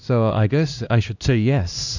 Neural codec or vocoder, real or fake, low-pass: codec, 16 kHz, 1 kbps, X-Codec, HuBERT features, trained on LibriSpeech; fake; 7.2 kHz